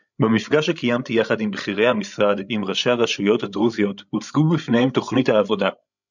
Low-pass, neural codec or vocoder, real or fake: 7.2 kHz; codec, 16 kHz, 16 kbps, FreqCodec, larger model; fake